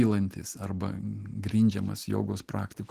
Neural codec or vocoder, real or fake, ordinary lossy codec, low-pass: none; real; Opus, 16 kbps; 14.4 kHz